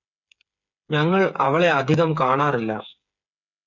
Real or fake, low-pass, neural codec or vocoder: fake; 7.2 kHz; codec, 16 kHz, 8 kbps, FreqCodec, smaller model